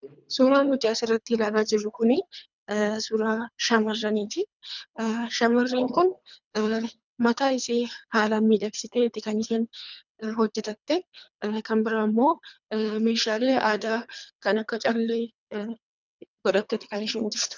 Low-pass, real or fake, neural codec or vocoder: 7.2 kHz; fake; codec, 24 kHz, 3 kbps, HILCodec